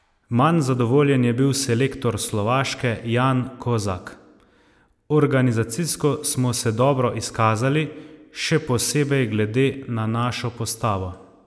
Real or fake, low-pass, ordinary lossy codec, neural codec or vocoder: real; none; none; none